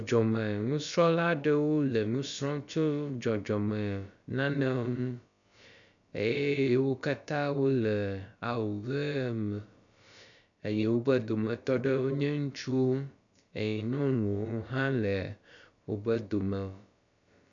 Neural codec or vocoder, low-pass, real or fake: codec, 16 kHz, about 1 kbps, DyCAST, with the encoder's durations; 7.2 kHz; fake